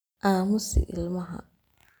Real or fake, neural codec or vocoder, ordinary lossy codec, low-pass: real; none; none; none